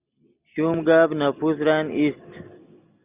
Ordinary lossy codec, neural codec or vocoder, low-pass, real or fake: Opus, 64 kbps; none; 3.6 kHz; real